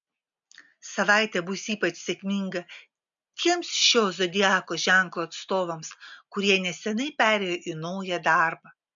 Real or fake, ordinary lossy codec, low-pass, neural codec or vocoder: real; MP3, 64 kbps; 7.2 kHz; none